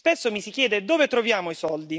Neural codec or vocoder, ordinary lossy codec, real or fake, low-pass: none; none; real; none